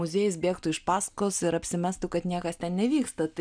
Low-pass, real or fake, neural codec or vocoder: 9.9 kHz; real; none